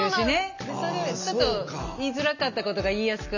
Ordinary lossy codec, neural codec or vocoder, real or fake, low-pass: none; none; real; 7.2 kHz